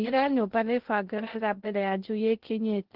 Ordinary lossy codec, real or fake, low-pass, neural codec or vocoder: Opus, 16 kbps; fake; 5.4 kHz; codec, 16 kHz in and 24 kHz out, 0.6 kbps, FocalCodec, streaming, 2048 codes